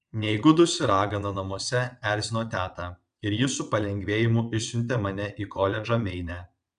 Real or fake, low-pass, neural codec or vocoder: fake; 9.9 kHz; vocoder, 22.05 kHz, 80 mel bands, WaveNeXt